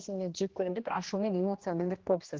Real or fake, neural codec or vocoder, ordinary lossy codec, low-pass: fake; codec, 16 kHz, 1 kbps, X-Codec, HuBERT features, trained on general audio; Opus, 16 kbps; 7.2 kHz